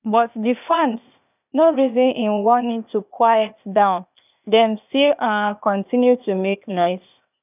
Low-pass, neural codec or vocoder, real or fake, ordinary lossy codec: 3.6 kHz; codec, 16 kHz, 0.8 kbps, ZipCodec; fake; none